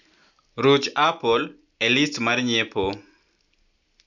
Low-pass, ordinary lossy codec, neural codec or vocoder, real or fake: 7.2 kHz; none; none; real